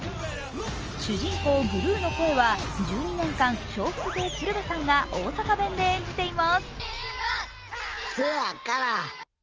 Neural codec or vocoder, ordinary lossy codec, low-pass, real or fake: none; Opus, 24 kbps; 7.2 kHz; real